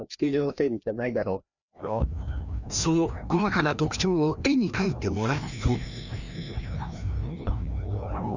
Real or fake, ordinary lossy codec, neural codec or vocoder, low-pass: fake; none; codec, 16 kHz, 1 kbps, FreqCodec, larger model; 7.2 kHz